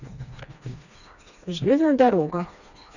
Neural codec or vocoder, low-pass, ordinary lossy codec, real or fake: codec, 16 kHz, 2 kbps, FreqCodec, smaller model; 7.2 kHz; none; fake